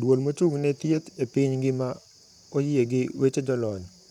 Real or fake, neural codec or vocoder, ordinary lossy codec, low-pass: fake; vocoder, 44.1 kHz, 128 mel bands, Pupu-Vocoder; none; 19.8 kHz